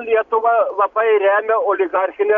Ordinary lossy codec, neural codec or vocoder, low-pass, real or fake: MP3, 64 kbps; none; 7.2 kHz; real